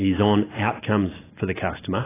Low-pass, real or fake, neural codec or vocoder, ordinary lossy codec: 3.6 kHz; real; none; AAC, 16 kbps